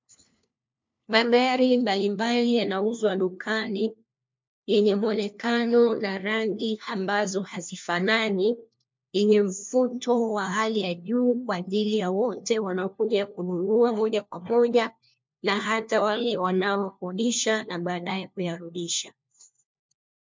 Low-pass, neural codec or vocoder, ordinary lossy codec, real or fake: 7.2 kHz; codec, 16 kHz, 1 kbps, FunCodec, trained on LibriTTS, 50 frames a second; MP3, 64 kbps; fake